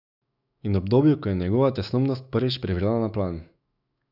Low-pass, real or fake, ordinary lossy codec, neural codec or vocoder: 5.4 kHz; fake; none; autoencoder, 48 kHz, 128 numbers a frame, DAC-VAE, trained on Japanese speech